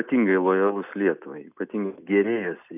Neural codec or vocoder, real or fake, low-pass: none; real; 3.6 kHz